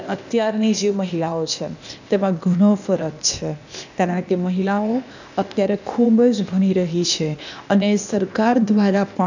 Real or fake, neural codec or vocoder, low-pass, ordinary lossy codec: fake; codec, 16 kHz, 0.8 kbps, ZipCodec; 7.2 kHz; none